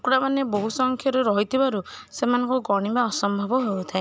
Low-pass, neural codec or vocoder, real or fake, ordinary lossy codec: none; none; real; none